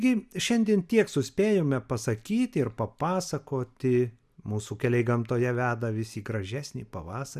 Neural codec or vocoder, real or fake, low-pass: none; real; 14.4 kHz